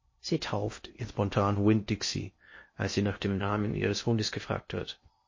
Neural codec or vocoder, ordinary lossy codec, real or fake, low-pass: codec, 16 kHz in and 24 kHz out, 0.6 kbps, FocalCodec, streaming, 2048 codes; MP3, 32 kbps; fake; 7.2 kHz